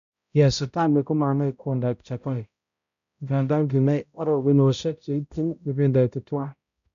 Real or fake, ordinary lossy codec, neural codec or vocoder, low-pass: fake; none; codec, 16 kHz, 0.5 kbps, X-Codec, HuBERT features, trained on balanced general audio; 7.2 kHz